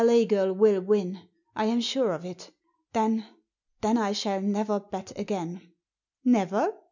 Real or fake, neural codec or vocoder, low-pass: real; none; 7.2 kHz